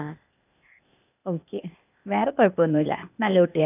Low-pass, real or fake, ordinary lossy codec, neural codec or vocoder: 3.6 kHz; fake; none; codec, 16 kHz, 0.8 kbps, ZipCodec